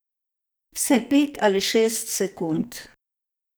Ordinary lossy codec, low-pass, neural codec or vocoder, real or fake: none; none; codec, 44.1 kHz, 2.6 kbps, SNAC; fake